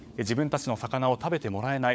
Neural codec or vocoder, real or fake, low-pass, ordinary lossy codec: codec, 16 kHz, 16 kbps, FunCodec, trained on LibriTTS, 50 frames a second; fake; none; none